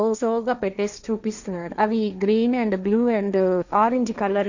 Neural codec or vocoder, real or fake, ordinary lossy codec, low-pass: codec, 16 kHz, 1.1 kbps, Voila-Tokenizer; fake; none; 7.2 kHz